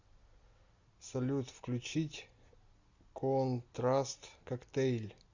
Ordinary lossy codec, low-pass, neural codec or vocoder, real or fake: Opus, 64 kbps; 7.2 kHz; none; real